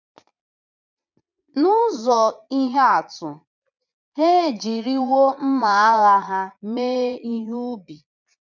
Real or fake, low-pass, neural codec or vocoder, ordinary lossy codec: fake; 7.2 kHz; vocoder, 22.05 kHz, 80 mel bands, Vocos; none